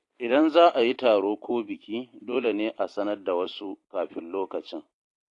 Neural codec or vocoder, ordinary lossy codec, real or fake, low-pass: vocoder, 44.1 kHz, 128 mel bands every 256 samples, BigVGAN v2; AAC, 48 kbps; fake; 10.8 kHz